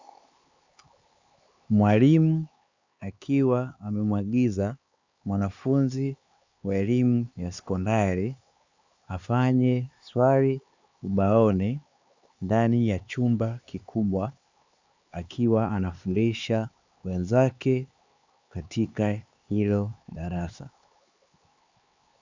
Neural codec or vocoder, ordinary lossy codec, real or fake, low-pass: codec, 16 kHz, 4 kbps, X-Codec, HuBERT features, trained on LibriSpeech; Opus, 64 kbps; fake; 7.2 kHz